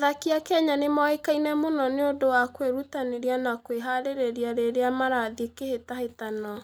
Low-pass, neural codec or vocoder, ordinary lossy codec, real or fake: none; none; none; real